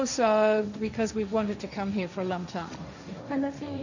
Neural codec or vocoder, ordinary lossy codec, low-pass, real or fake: codec, 16 kHz, 1.1 kbps, Voila-Tokenizer; none; 7.2 kHz; fake